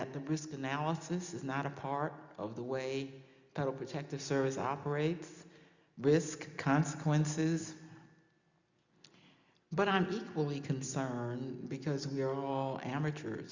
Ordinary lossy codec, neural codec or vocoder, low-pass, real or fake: Opus, 64 kbps; none; 7.2 kHz; real